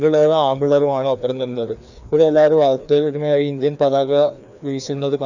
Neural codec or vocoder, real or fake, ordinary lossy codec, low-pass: codec, 16 kHz, 2 kbps, FreqCodec, larger model; fake; none; 7.2 kHz